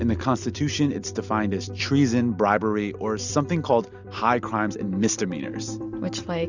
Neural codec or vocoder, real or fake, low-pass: none; real; 7.2 kHz